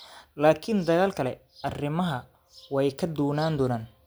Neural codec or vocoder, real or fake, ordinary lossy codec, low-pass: none; real; none; none